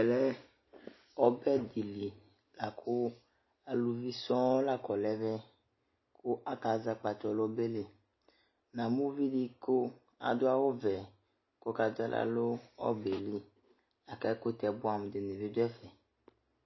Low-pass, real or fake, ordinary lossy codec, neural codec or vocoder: 7.2 kHz; real; MP3, 24 kbps; none